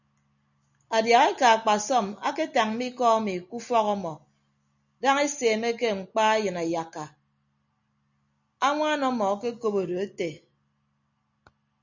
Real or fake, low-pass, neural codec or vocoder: real; 7.2 kHz; none